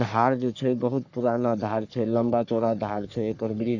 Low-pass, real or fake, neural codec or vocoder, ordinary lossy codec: 7.2 kHz; fake; codec, 44.1 kHz, 3.4 kbps, Pupu-Codec; none